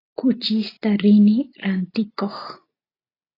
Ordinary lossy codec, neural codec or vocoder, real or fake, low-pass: AAC, 24 kbps; none; real; 5.4 kHz